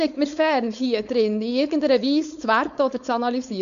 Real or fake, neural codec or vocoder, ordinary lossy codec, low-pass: fake; codec, 16 kHz, 4.8 kbps, FACodec; none; 7.2 kHz